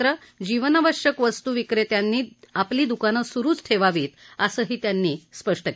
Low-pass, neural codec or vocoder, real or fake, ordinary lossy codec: none; none; real; none